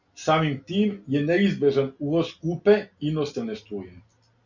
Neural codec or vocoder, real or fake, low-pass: none; real; 7.2 kHz